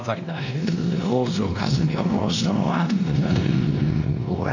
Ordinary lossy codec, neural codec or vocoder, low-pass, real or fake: none; codec, 16 kHz, 1 kbps, X-Codec, WavLM features, trained on Multilingual LibriSpeech; 7.2 kHz; fake